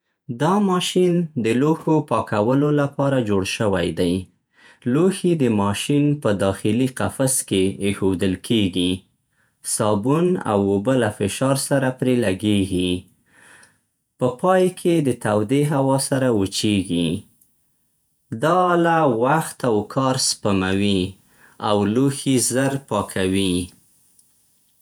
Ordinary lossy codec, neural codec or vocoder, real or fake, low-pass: none; vocoder, 48 kHz, 128 mel bands, Vocos; fake; none